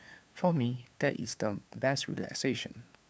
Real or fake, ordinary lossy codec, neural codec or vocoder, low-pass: fake; none; codec, 16 kHz, 2 kbps, FunCodec, trained on LibriTTS, 25 frames a second; none